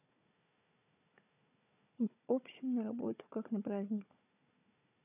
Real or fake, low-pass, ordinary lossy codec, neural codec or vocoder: fake; 3.6 kHz; none; codec, 16 kHz, 4 kbps, FunCodec, trained on Chinese and English, 50 frames a second